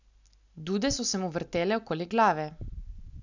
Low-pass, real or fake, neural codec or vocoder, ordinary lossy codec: 7.2 kHz; real; none; none